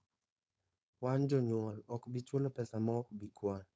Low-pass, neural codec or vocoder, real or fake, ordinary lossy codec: none; codec, 16 kHz, 4.8 kbps, FACodec; fake; none